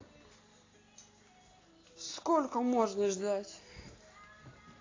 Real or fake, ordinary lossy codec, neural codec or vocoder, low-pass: real; AAC, 32 kbps; none; 7.2 kHz